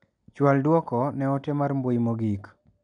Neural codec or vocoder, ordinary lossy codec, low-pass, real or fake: none; none; 9.9 kHz; real